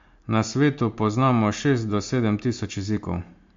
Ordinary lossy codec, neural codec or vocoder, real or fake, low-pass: MP3, 48 kbps; none; real; 7.2 kHz